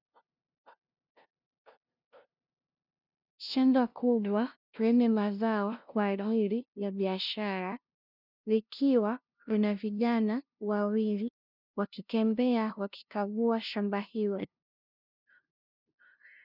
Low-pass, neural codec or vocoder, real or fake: 5.4 kHz; codec, 16 kHz, 0.5 kbps, FunCodec, trained on LibriTTS, 25 frames a second; fake